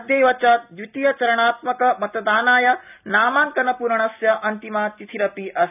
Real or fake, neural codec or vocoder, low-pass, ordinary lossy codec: real; none; 3.6 kHz; none